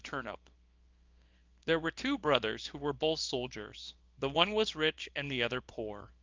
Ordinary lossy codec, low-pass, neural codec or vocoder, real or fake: Opus, 32 kbps; 7.2 kHz; codec, 24 kHz, 0.9 kbps, WavTokenizer, small release; fake